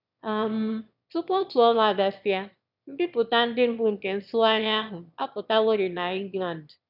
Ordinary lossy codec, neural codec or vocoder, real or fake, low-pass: none; autoencoder, 22.05 kHz, a latent of 192 numbers a frame, VITS, trained on one speaker; fake; 5.4 kHz